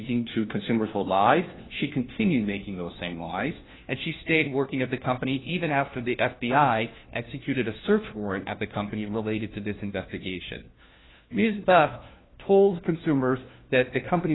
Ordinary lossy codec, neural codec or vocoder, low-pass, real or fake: AAC, 16 kbps; codec, 16 kHz, 1 kbps, FunCodec, trained on LibriTTS, 50 frames a second; 7.2 kHz; fake